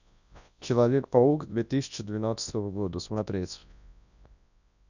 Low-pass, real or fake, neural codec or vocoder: 7.2 kHz; fake; codec, 24 kHz, 0.9 kbps, WavTokenizer, large speech release